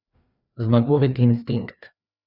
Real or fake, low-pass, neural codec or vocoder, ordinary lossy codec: fake; 5.4 kHz; codec, 16 kHz, 2 kbps, FreqCodec, larger model; none